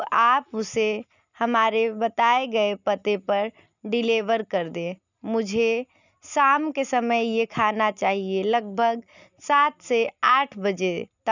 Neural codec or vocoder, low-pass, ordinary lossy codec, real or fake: none; 7.2 kHz; none; real